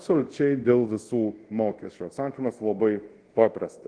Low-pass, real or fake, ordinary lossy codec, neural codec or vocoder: 9.9 kHz; fake; Opus, 16 kbps; codec, 24 kHz, 0.5 kbps, DualCodec